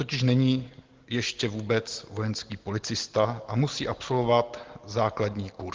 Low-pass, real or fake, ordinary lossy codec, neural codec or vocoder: 7.2 kHz; real; Opus, 16 kbps; none